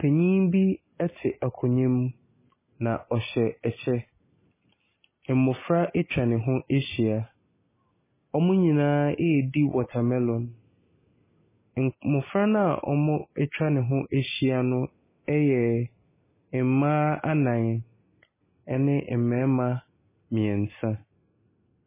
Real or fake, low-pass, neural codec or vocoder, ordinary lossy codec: real; 3.6 kHz; none; MP3, 16 kbps